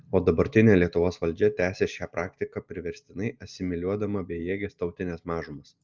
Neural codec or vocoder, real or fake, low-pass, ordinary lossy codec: none; real; 7.2 kHz; Opus, 24 kbps